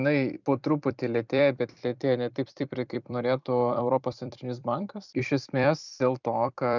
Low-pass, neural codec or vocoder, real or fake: 7.2 kHz; vocoder, 44.1 kHz, 128 mel bands every 256 samples, BigVGAN v2; fake